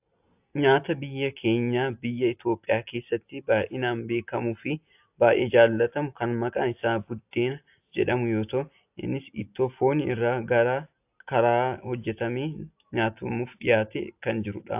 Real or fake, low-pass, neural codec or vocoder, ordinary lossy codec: real; 3.6 kHz; none; AAC, 32 kbps